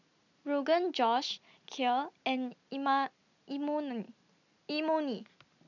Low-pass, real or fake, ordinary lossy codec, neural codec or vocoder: 7.2 kHz; real; none; none